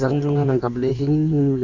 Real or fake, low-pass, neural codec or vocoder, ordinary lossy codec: fake; 7.2 kHz; codec, 16 kHz in and 24 kHz out, 1.1 kbps, FireRedTTS-2 codec; none